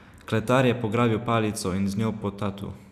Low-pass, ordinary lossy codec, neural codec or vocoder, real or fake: 14.4 kHz; none; none; real